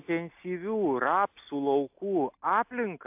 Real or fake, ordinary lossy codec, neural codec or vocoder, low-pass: real; AAC, 32 kbps; none; 3.6 kHz